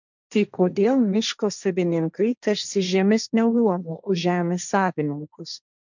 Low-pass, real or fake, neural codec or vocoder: 7.2 kHz; fake; codec, 16 kHz, 1.1 kbps, Voila-Tokenizer